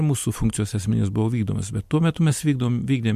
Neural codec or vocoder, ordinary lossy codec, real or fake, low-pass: vocoder, 44.1 kHz, 128 mel bands every 256 samples, BigVGAN v2; MP3, 64 kbps; fake; 14.4 kHz